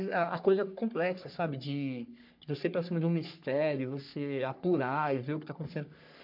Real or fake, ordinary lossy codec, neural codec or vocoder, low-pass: fake; MP3, 48 kbps; codec, 44.1 kHz, 3.4 kbps, Pupu-Codec; 5.4 kHz